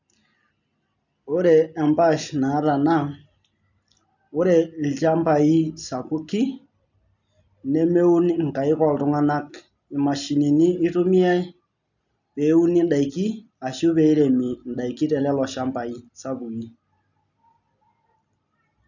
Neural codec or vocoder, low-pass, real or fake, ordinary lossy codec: none; 7.2 kHz; real; none